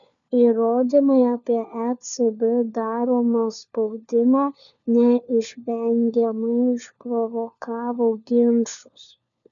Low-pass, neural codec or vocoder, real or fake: 7.2 kHz; codec, 16 kHz, 4 kbps, FunCodec, trained on LibriTTS, 50 frames a second; fake